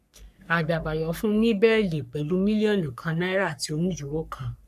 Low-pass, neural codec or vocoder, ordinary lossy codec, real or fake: 14.4 kHz; codec, 44.1 kHz, 3.4 kbps, Pupu-Codec; none; fake